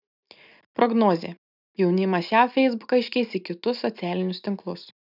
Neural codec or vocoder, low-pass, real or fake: none; 5.4 kHz; real